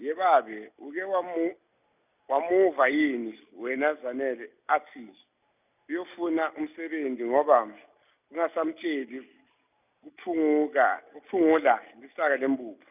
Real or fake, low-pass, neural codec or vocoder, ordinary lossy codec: real; 3.6 kHz; none; none